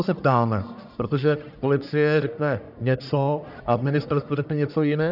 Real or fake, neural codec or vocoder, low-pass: fake; codec, 44.1 kHz, 1.7 kbps, Pupu-Codec; 5.4 kHz